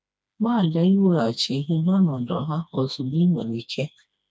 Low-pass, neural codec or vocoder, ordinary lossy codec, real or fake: none; codec, 16 kHz, 2 kbps, FreqCodec, smaller model; none; fake